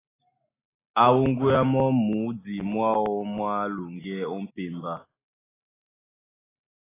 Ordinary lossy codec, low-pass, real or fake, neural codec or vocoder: AAC, 16 kbps; 3.6 kHz; real; none